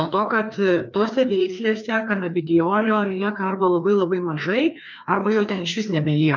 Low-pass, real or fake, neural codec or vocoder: 7.2 kHz; fake; codec, 16 kHz, 2 kbps, FreqCodec, larger model